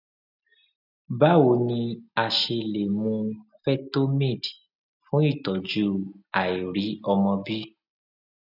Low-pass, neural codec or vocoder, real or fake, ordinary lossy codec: 5.4 kHz; none; real; none